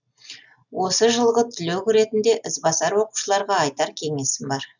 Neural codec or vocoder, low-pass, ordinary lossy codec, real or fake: none; 7.2 kHz; none; real